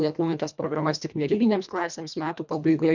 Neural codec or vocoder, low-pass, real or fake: codec, 24 kHz, 1.5 kbps, HILCodec; 7.2 kHz; fake